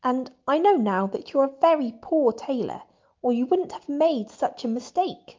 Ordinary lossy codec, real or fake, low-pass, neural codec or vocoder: Opus, 24 kbps; real; 7.2 kHz; none